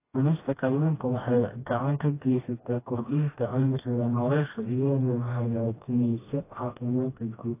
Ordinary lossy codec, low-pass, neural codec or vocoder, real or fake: AAC, 24 kbps; 3.6 kHz; codec, 16 kHz, 1 kbps, FreqCodec, smaller model; fake